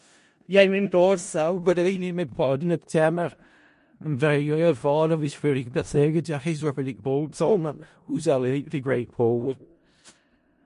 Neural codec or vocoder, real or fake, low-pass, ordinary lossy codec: codec, 16 kHz in and 24 kHz out, 0.4 kbps, LongCat-Audio-Codec, four codebook decoder; fake; 10.8 kHz; MP3, 48 kbps